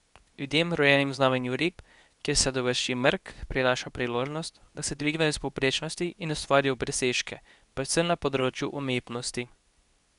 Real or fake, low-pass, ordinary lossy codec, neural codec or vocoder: fake; 10.8 kHz; none; codec, 24 kHz, 0.9 kbps, WavTokenizer, medium speech release version 2